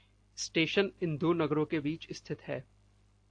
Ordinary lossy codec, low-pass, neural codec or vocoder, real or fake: AAC, 48 kbps; 9.9 kHz; none; real